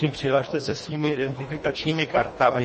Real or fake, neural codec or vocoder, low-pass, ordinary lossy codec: fake; codec, 24 kHz, 1.5 kbps, HILCodec; 10.8 kHz; MP3, 32 kbps